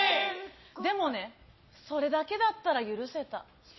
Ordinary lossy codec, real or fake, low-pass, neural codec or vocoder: MP3, 24 kbps; real; 7.2 kHz; none